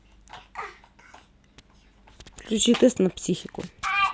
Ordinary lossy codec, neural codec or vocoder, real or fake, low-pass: none; none; real; none